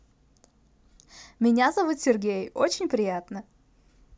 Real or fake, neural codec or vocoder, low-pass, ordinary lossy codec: real; none; none; none